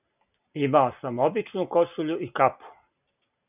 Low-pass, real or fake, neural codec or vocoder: 3.6 kHz; real; none